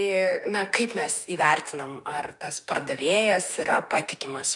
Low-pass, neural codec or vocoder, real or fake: 10.8 kHz; autoencoder, 48 kHz, 32 numbers a frame, DAC-VAE, trained on Japanese speech; fake